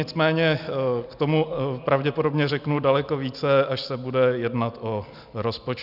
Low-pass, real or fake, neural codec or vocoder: 5.4 kHz; real; none